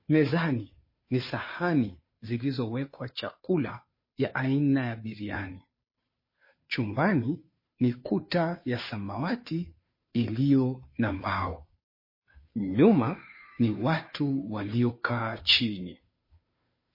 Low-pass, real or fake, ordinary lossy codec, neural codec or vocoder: 5.4 kHz; fake; MP3, 24 kbps; codec, 16 kHz, 2 kbps, FunCodec, trained on Chinese and English, 25 frames a second